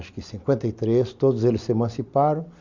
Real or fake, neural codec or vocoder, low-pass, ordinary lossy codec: real; none; 7.2 kHz; Opus, 64 kbps